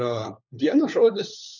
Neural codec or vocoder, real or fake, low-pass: codec, 16 kHz, 4 kbps, FunCodec, trained on LibriTTS, 50 frames a second; fake; 7.2 kHz